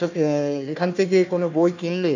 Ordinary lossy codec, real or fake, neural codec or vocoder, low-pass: none; fake; codec, 16 kHz, 1 kbps, FunCodec, trained on Chinese and English, 50 frames a second; 7.2 kHz